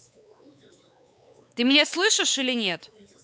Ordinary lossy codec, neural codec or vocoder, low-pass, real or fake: none; codec, 16 kHz, 4 kbps, X-Codec, WavLM features, trained on Multilingual LibriSpeech; none; fake